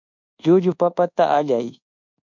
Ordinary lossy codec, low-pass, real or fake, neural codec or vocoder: MP3, 64 kbps; 7.2 kHz; fake; codec, 24 kHz, 1.2 kbps, DualCodec